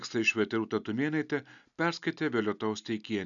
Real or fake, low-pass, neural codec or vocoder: real; 7.2 kHz; none